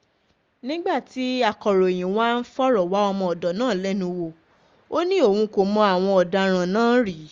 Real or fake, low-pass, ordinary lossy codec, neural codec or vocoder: real; 7.2 kHz; Opus, 32 kbps; none